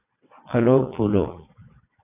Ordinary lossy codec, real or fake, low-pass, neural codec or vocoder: AAC, 24 kbps; fake; 3.6 kHz; vocoder, 22.05 kHz, 80 mel bands, WaveNeXt